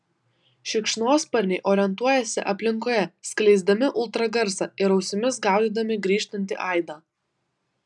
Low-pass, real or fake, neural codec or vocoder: 10.8 kHz; real; none